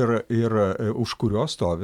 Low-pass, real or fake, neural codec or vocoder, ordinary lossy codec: 19.8 kHz; real; none; MP3, 96 kbps